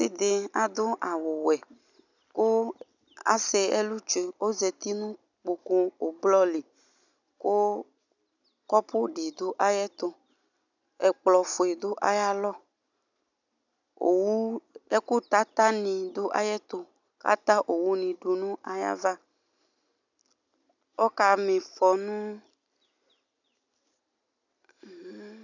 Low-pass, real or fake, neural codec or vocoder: 7.2 kHz; real; none